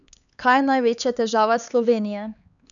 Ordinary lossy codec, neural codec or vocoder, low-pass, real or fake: none; codec, 16 kHz, 4 kbps, X-Codec, HuBERT features, trained on LibriSpeech; 7.2 kHz; fake